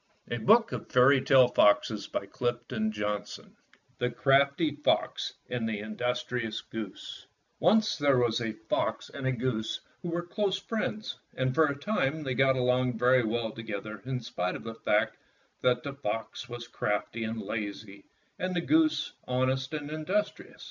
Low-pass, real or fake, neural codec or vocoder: 7.2 kHz; real; none